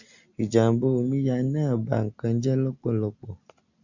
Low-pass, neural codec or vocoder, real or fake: 7.2 kHz; none; real